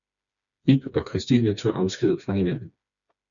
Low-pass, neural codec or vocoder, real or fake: 7.2 kHz; codec, 16 kHz, 2 kbps, FreqCodec, smaller model; fake